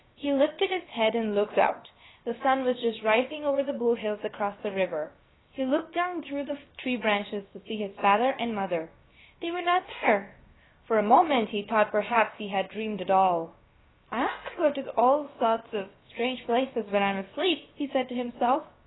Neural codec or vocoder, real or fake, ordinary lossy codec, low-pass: codec, 16 kHz, about 1 kbps, DyCAST, with the encoder's durations; fake; AAC, 16 kbps; 7.2 kHz